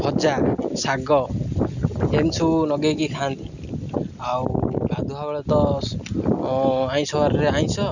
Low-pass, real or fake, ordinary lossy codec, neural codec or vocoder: 7.2 kHz; real; none; none